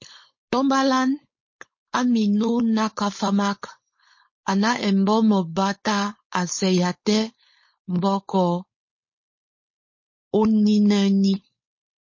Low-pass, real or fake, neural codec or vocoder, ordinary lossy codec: 7.2 kHz; fake; codec, 16 kHz, 4.8 kbps, FACodec; MP3, 32 kbps